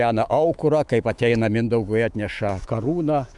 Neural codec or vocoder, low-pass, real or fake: autoencoder, 48 kHz, 128 numbers a frame, DAC-VAE, trained on Japanese speech; 10.8 kHz; fake